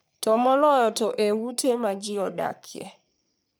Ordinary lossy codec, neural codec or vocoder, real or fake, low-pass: none; codec, 44.1 kHz, 3.4 kbps, Pupu-Codec; fake; none